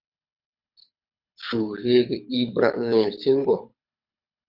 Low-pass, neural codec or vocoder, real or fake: 5.4 kHz; codec, 24 kHz, 6 kbps, HILCodec; fake